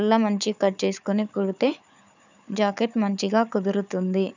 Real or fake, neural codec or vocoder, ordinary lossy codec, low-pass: fake; codec, 16 kHz, 4 kbps, FunCodec, trained on Chinese and English, 50 frames a second; none; 7.2 kHz